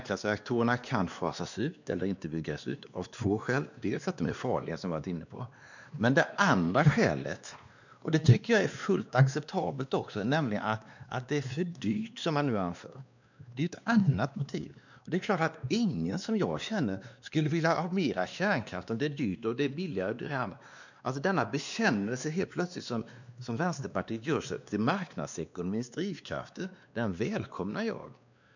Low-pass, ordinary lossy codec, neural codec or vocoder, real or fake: 7.2 kHz; none; codec, 16 kHz, 2 kbps, X-Codec, WavLM features, trained on Multilingual LibriSpeech; fake